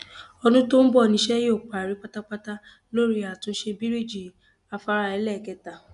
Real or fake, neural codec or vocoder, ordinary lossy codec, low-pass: real; none; none; 10.8 kHz